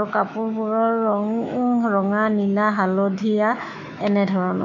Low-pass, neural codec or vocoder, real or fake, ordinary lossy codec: 7.2 kHz; autoencoder, 48 kHz, 128 numbers a frame, DAC-VAE, trained on Japanese speech; fake; none